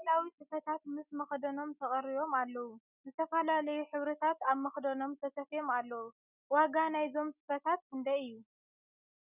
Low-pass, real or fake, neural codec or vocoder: 3.6 kHz; real; none